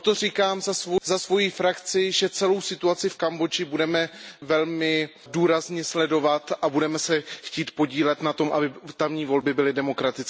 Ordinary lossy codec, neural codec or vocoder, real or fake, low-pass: none; none; real; none